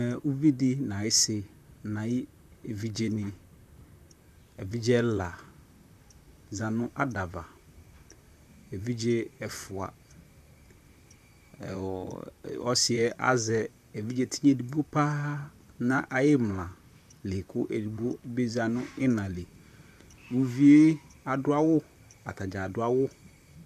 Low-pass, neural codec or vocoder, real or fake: 14.4 kHz; vocoder, 44.1 kHz, 128 mel bands, Pupu-Vocoder; fake